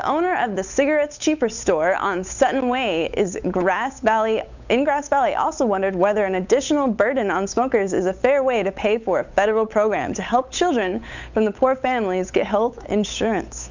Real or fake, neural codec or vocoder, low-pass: fake; codec, 16 kHz, 8 kbps, FunCodec, trained on Chinese and English, 25 frames a second; 7.2 kHz